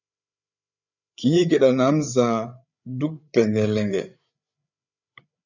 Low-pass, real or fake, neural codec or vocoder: 7.2 kHz; fake; codec, 16 kHz, 8 kbps, FreqCodec, larger model